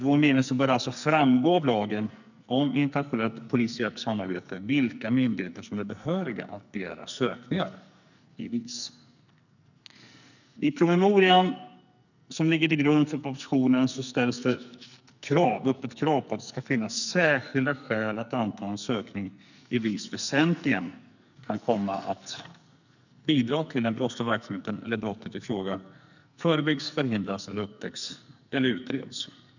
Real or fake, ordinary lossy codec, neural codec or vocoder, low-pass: fake; none; codec, 44.1 kHz, 2.6 kbps, SNAC; 7.2 kHz